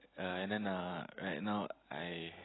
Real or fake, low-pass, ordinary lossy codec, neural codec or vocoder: real; 7.2 kHz; AAC, 16 kbps; none